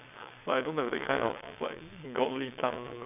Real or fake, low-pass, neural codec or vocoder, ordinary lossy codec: fake; 3.6 kHz; vocoder, 22.05 kHz, 80 mel bands, WaveNeXt; none